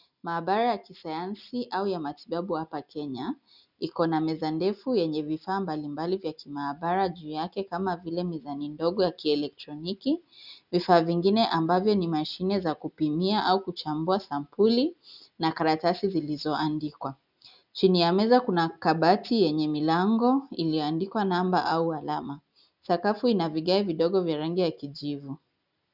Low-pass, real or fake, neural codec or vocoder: 5.4 kHz; real; none